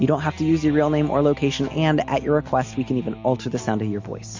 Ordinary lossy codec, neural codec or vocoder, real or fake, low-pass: MP3, 48 kbps; none; real; 7.2 kHz